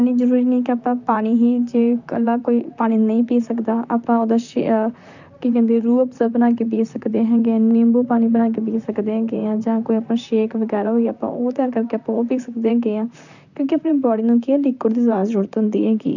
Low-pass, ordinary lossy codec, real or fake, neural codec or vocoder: 7.2 kHz; none; fake; vocoder, 44.1 kHz, 128 mel bands, Pupu-Vocoder